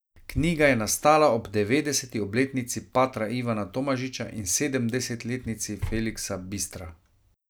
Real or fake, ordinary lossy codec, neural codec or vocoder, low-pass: real; none; none; none